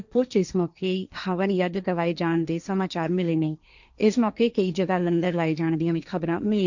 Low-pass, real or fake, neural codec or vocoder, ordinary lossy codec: 7.2 kHz; fake; codec, 16 kHz, 1.1 kbps, Voila-Tokenizer; none